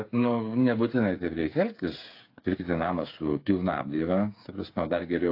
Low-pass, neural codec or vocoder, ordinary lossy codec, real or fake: 5.4 kHz; codec, 16 kHz, 8 kbps, FreqCodec, smaller model; AAC, 32 kbps; fake